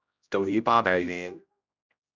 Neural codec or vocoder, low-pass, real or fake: codec, 16 kHz, 0.5 kbps, X-Codec, HuBERT features, trained on general audio; 7.2 kHz; fake